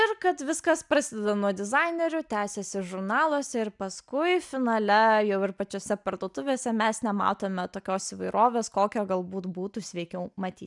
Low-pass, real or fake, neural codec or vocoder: 10.8 kHz; real; none